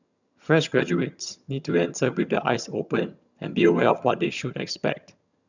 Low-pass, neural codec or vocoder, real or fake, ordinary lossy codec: 7.2 kHz; vocoder, 22.05 kHz, 80 mel bands, HiFi-GAN; fake; none